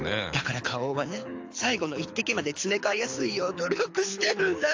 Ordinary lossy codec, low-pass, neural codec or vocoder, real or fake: AAC, 48 kbps; 7.2 kHz; codec, 24 kHz, 6 kbps, HILCodec; fake